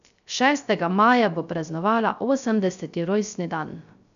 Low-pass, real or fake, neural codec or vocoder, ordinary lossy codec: 7.2 kHz; fake; codec, 16 kHz, 0.3 kbps, FocalCodec; none